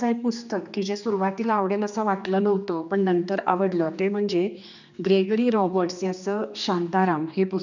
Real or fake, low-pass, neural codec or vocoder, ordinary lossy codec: fake; 7.2 kHz; codec, 16 kHz, 2 kbps, X-Codec, HuBERT features, trained on general audio; none